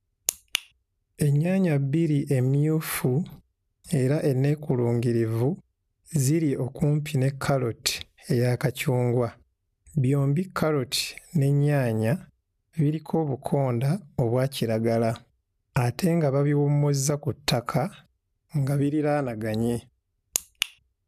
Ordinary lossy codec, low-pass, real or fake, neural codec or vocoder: none; 14.4 kHz; real; none